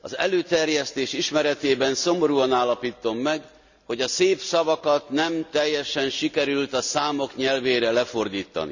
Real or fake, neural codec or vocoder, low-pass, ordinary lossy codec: real; none; 7.2 kHz; none